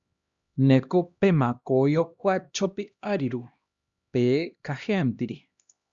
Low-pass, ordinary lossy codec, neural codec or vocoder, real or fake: 7.2 kHz; Opus, 64 kbps; codec, 16 kHz, 1 kbps, X-Codec, HuBERT features, trained on LibriSpeech; fake